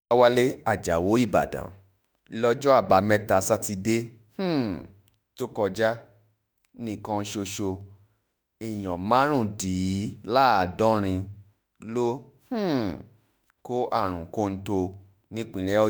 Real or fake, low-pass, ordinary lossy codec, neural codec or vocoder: fake; none; none; autoencoder, 48 kHz, 32 numbers a frame, DAC-VAE, trained on Japanese speech